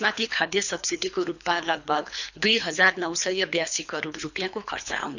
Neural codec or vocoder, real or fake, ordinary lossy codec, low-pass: codec, 24 kHz, 3 kbps, HILCodec; fake; none; 7.2 kHz